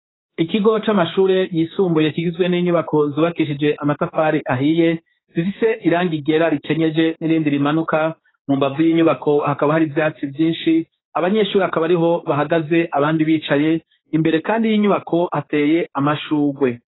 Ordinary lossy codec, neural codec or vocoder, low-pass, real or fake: AAC, 16 kbps; codec, 16 kHz, 4 kbps, X-Codec, HuBERT features, trained on general audio; 7.2 kHz; fake